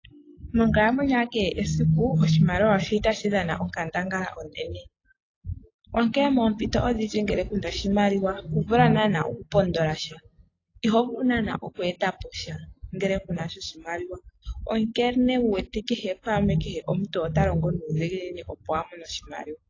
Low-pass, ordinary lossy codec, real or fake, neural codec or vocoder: 7.2 kHz; AAC, 32 kbps; real; none